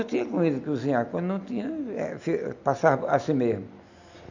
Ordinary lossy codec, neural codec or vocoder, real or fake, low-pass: none; none; real; 7.2 kHz